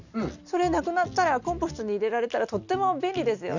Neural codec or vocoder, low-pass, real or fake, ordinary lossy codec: none; 7.2 kHz; real; none